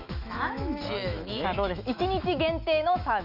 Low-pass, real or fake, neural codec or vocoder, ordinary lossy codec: 5.4 kHz; fake; autoencoder, 48 kHz, 128 numbers a frame, DAC-VAE, trained on Japanese speech; none